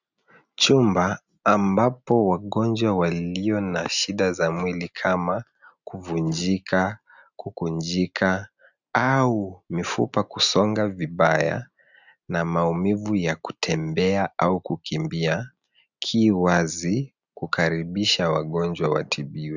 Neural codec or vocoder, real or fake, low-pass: none; real; 7.2 kHz